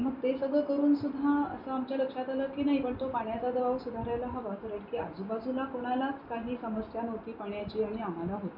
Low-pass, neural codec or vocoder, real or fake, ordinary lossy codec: 5.4 kHz; none; real; MP3, 48 kbps